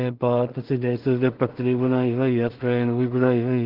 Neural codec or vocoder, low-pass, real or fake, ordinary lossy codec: codec, 16 kHz in and 24 kHz out, 0.4 kbps, LongCat-Audio-Codec, two codebook decoder; 5.4 kHz; fake; Opus, 16 kbps